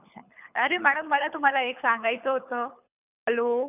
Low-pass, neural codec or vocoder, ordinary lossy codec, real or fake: 3.6 kHz; codec, 24 kHz, 6 kbps, HILCodec; none; fake